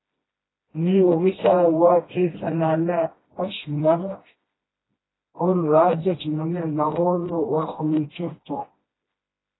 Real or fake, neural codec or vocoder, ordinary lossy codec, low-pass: fake; codec, 16 kHz, 1 kbps, FreqCodec, smaller model; AAC, 16 kbps; 7.2 kHz